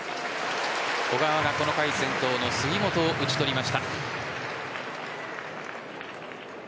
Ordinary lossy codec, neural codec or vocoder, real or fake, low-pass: none; none; real; none